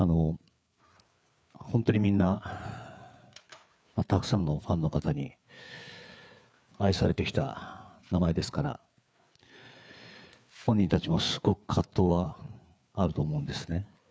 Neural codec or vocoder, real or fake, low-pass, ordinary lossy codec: codec, 16 kHz, 4 kbps, FreqCodec, larger model; fake; none; none